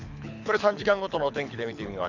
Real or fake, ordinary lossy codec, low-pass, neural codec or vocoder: fake; none; 7.2 kHz; codec, 24 kHz, 6 kbps, HILCodec